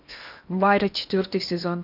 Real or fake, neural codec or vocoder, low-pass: fake; codec, 16 kHz in and 24 kHz out, 0.8 kbps, FocalCodec, streaming, 65536 codes; 5.4 kHz